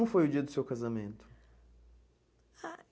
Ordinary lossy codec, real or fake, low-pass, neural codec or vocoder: none; real; none; none